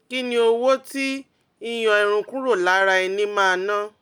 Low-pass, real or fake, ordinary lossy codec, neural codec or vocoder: 19.8 kHz; real; none; none